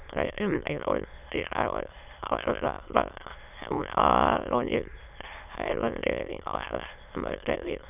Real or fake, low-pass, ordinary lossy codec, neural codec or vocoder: fake; 3.6 kHz; none; autoencoder, 22.05 kHz, a latent of 192 numbers a frame, VITS, trained on many speakers